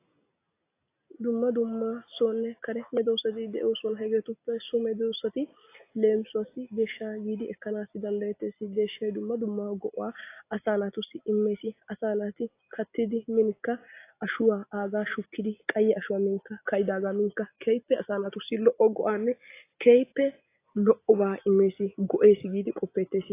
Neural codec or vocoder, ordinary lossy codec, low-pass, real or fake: none; AAC, 24 kbps; 3.6 kHz; real